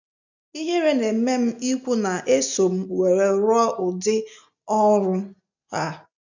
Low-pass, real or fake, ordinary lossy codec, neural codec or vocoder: 7.2 kHz; real; none; none